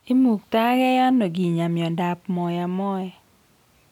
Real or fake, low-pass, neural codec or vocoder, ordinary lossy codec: real; 19.8 kHz; none; none